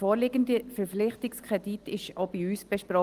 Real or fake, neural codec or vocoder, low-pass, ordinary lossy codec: real; none; 14.4 kHz; Opus, 24 kbps